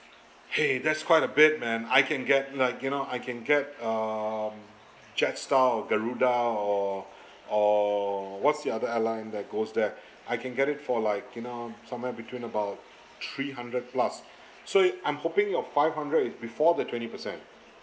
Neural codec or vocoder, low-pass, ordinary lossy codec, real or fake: none; none; none; real